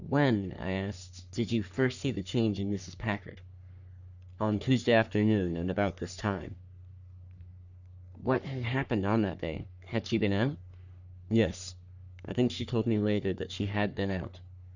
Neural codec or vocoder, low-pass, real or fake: codec, 44.1 kHz, 3.4 kbps, Pupu-Codec; 7.2 kHz; fake